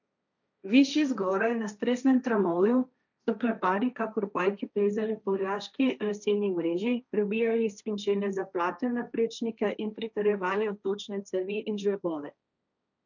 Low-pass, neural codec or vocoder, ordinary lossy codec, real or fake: 7.2 kHz; codec, 16 kHz, 1.1 kbps, Voila-Tokenizer; none; fake